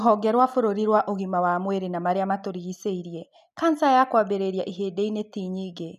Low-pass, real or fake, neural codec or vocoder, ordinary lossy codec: 14.4 kHz; real; none; none